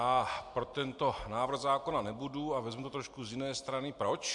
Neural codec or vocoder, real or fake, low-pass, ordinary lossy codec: none; real; 10.8 kHz; MP3, 64 kbps